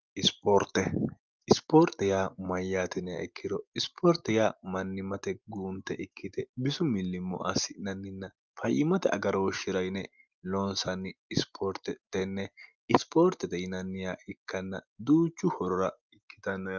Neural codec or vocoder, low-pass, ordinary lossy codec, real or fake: none; 7.2 kHz; Opus, 32 kbps; real